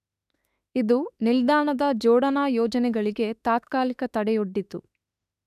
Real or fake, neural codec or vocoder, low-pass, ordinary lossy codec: fake; autoencoder, 48 kHz, 32 numbers a frame, DAC-VAE, trained on Japanese speech; 14.4 kHz; none